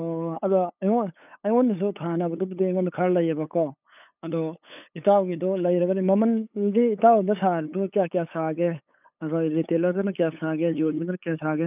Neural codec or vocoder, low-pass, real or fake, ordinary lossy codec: codec, 16 kHz, 8 kbps, FunCodec, trained on LibriTTS, 25 frames a second; 3.6 kHz; fake; none